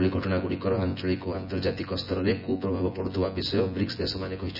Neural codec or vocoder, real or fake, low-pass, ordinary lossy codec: vocoder, 24 kHz, 100 mel bands, Vocos; fake; 5.4 kHz; none